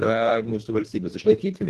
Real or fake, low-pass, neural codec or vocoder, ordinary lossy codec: fake; 10.8 kHz; codec, 24 kHz, 1.5 kbps, HILCodec; Opus, 16 kbps